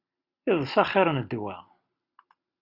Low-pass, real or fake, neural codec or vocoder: 5.4 kHz; real; none